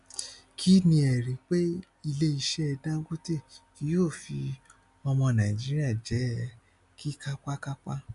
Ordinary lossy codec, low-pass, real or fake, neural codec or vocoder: none; 10.8 kHz; real; none